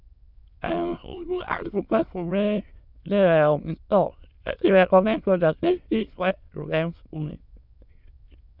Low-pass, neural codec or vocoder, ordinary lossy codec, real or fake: 5.4 kHz; autoencoder, 22.05 kHz, a latent of 192 numbers a frame, VITS, trained on many speakers; none; fake